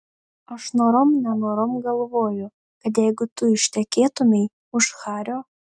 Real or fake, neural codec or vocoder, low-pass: real; none; 9.9 kHz